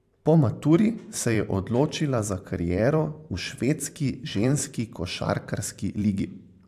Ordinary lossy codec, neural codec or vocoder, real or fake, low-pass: AAC, 96 kbps; vocoder, 44.1 kHz, 128 mel bands every 512 samples, BigVGAN v2; fake; 14.4 kHz